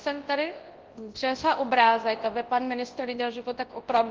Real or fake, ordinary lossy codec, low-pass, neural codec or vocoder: fake; Opus, 16 kbps; 7.2 kHz; codec, 24 kHz, 0.9 kbps, WavTokenizer, large speech release